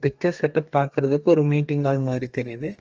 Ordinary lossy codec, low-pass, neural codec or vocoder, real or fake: Opus, 24 kbps; 7.2 kHz; codec, 32 kHz, 1.9 kbps, SNAC; fake